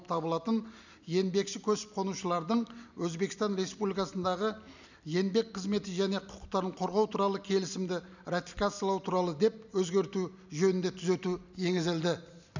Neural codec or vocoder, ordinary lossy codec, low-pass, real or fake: none; none; 7.2 kHz; real